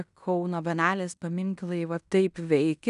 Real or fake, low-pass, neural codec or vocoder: fake; 10.8 kHz; codec, 16 kHz in and 24 kHz out, 0.9 kbps, LongCat-Audio-Codec, fine tuned four codebook decoder